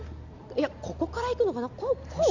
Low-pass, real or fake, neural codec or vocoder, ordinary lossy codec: 7.2 kHz; real; none; none